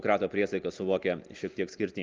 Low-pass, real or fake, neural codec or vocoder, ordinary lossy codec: 7.2 kHz; real; none; Opus, 24 kbps